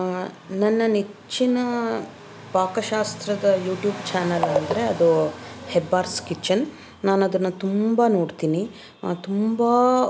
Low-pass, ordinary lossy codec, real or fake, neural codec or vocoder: none; none; real; none